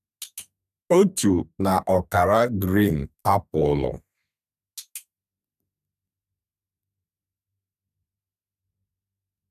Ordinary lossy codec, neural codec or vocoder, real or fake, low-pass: none; codec, 32 kHz, 1.9 kbps, SNAC; fake; 14.4 kHz